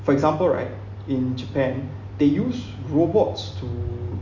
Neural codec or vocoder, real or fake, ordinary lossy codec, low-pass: none; real; Opus, 64 kbps; 7.2 kHz